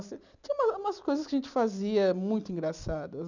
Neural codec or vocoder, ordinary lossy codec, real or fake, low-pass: none; none; real; 7.2 kHz